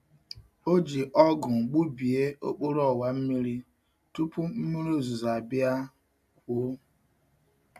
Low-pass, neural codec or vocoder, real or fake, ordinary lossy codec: 14.4 kHz; none; real; AAC, 96 kbps